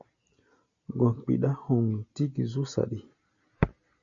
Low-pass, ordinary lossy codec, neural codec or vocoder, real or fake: 7.2 kHz; MP3, 64 kbps; none; real